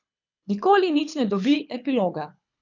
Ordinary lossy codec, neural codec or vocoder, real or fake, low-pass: none; codec, 24 kHz, 6 kbps, HILCodec; fake; 7.2 kHz